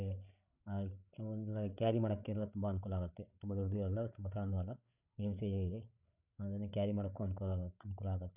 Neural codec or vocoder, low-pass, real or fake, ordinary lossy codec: codec, 16 kHz, 8 kbps, FreqCodec, larger model; 3.6 kHz; fake; none